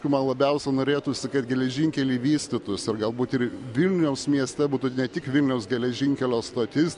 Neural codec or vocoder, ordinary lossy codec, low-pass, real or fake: none; MP3, 64 kbps; 10.8 kHz; real